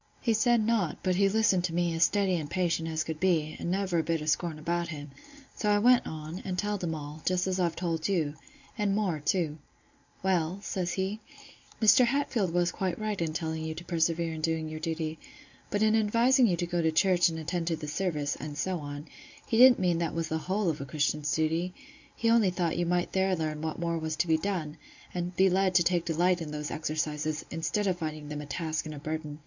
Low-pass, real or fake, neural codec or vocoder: 7.2 kHz; real; none